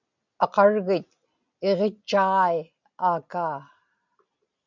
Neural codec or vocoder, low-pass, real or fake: none; 7.2 kHz; real